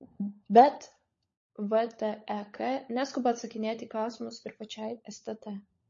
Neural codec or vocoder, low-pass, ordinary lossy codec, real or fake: codec, 16 kHz, 16 kbps, FunCodec, trained on LibriTTS, 50 frames a second; 7.2 kHz; MP3, 32 kbps; fake